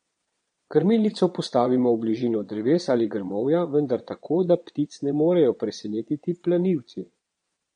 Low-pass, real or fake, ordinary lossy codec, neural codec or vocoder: 9.9 kHz; fake; MP3, 48 kbps; vocoder, 22.05 kHz, 80 mel bands, Vocos